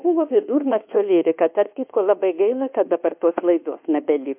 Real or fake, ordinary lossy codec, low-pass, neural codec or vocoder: fake; MP3, 32 kbps; 3.6 kHz; codec, 24 kHz, 1.2 kbps, DualCodec